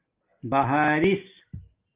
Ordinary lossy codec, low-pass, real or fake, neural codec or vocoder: Opus, 32 kbps; 3.6 kHz; real; none